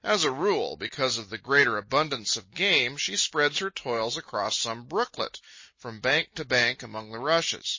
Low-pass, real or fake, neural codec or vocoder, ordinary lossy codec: 7.2 kHz; real; none; MP3, 32 kbps